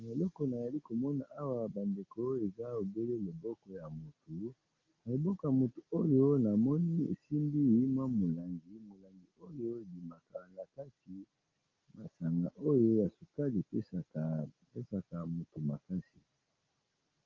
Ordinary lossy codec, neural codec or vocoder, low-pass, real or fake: Opus, 32 kbps; none; 7.2 kHz; real